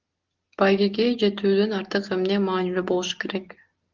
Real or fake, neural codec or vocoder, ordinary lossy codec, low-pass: real; none; Opus, 16 kbps; 7.2 kHz